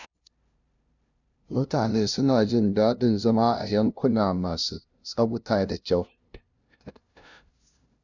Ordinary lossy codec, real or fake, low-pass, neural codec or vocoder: none; fake; 7.2 kHz; codec, 16 kHz, 0.5 kbps, FunCodec, trained on LibriTTS, 25 frames a second